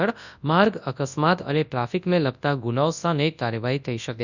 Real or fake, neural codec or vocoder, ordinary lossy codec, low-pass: fake; codec, 24 kHz, 0.9 kbps, WavTokenizer, large speech release; none; 7.2 kHz